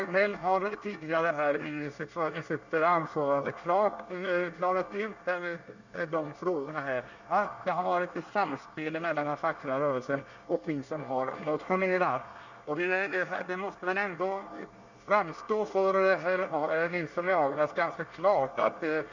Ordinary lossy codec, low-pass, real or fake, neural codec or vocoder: Opus, 64 kbps; 7.2 kHz; fake; codec, 24 kHz, 1 kbps, SNAC